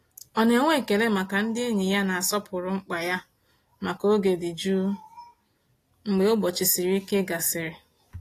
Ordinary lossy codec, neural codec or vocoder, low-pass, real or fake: AAC, 48 kbps; none; 14.4 kHz; real